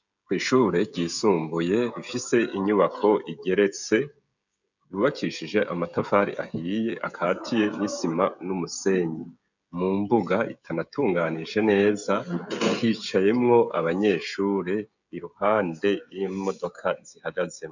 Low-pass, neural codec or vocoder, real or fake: 7.2 kHz; codec, 16 kHz, 16 kbps, FreqCodec, smaller model; fake